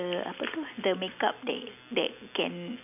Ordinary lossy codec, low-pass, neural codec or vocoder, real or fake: none; 3.6 kHz; none; real